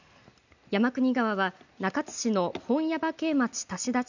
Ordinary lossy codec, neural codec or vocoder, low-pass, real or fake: none; none; 7.2 kHz; real